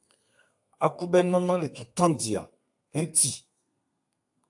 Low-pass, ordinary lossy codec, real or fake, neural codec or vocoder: 10.8 kHz; AAC, 64 kbps; fake; codec, 32 kHz, 1.9 kbps, SNAC